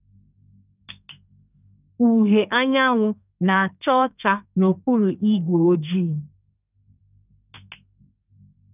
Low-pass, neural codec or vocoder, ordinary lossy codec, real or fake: 3.6 kHz; codec, 32 kHz, 1.9 kbps, SNAC; none; fake